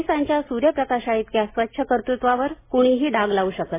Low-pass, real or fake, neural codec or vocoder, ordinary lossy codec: 3.6 kHz; real; none; MP3, 16 kbps